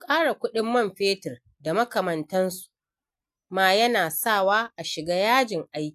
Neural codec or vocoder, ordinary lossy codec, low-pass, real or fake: none; AAC, 96 kbps; 14.4 kHz; real